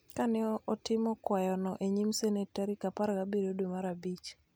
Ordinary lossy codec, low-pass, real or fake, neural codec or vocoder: none; none; real; none